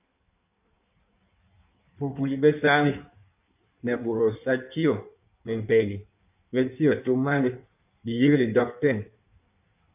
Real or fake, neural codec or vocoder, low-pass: fake; codec, 16 kHz in and 24 kHz out, 1.1 kbps, FireRedTTS-2 codec; 3.6 kHz